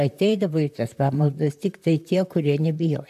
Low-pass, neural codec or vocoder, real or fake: 14.4 kHz; vocoder, 44.1 kHz, 128 mel bands, Pupu-Vocoder; fake